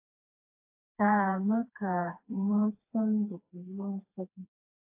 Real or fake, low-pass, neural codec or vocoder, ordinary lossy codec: fake; 3.6 kHz; codec, 16 kHz, 2 kbps, FreqCodec, smaller model; AAC, 32 kbps